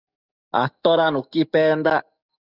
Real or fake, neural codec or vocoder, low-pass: fake; codec, 44.1 kHz, 7.8 kbps, DAC; 5.4 kHz